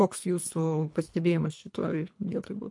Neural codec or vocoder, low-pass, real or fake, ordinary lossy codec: codec, 24 kHz, 3 kbps, HILCodec; 10.8 kHz; fake; MP3, 64 kbps